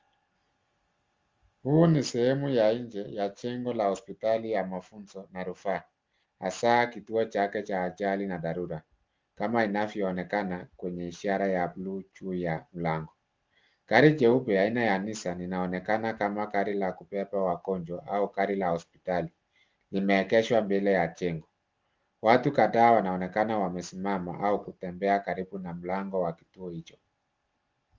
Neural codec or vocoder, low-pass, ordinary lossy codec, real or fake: none; 7.2 kHz; Opus, 32 kbps; real